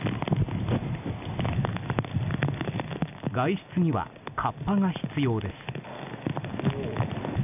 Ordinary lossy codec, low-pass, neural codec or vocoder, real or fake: none; 3.6 kHz; none; real